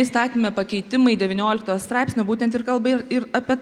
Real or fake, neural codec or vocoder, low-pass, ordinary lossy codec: real; none; 19.8 kHz; Opus, 32 kbps